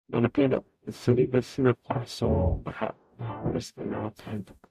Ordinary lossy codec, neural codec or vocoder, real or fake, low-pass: MP3, 64 kbps; codec, 44.1 kHz, 0.9 kbps, DAC; fake; 14.4 kHz